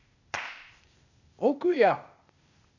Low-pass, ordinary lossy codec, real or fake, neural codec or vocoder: 7.2 kHz; none; fake; codec, 16 kHz, 0.8 kbps, ZipCodec